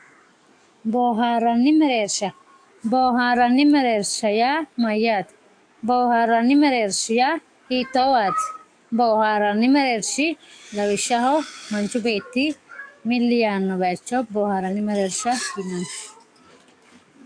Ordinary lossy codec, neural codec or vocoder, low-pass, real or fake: AAC, 64 kbps; codec, 44.1 kHz, 7.8 kbps, DAC; 9.9 kHz; fake